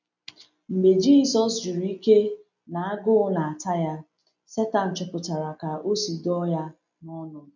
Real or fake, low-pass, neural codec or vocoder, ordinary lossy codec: real; 7.2 kHz; none; none